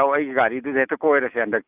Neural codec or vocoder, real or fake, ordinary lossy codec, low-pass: none; real; none; 3.6 kHz